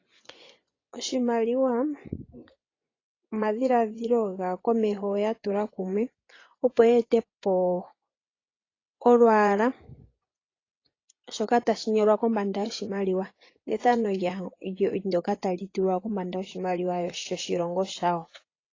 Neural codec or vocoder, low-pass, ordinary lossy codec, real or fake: vocoder, 24 kHz, 100 mel bands, Vocos; 7.2 kHz; AAC, 32 kbps; fake